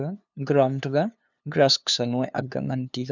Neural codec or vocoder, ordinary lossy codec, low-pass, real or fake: codec, 16 kHz, 2 kbps, FunCodec, trained on LibriTTS, 25 frames a second; none; 7.2 kHz; fake